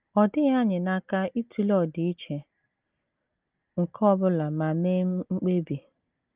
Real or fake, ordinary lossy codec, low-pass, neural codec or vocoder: real; Opus, 24 kbps; 3.6 kHz; none